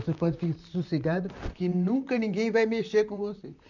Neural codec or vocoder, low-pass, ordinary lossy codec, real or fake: vocoder, 44.1 kHz, 128 mel bands, Pupu-Vocoder; 7.2 kHz; none; fake